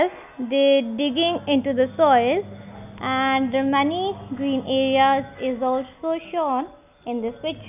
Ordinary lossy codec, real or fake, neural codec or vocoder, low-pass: none; real; none; 3.6 kHz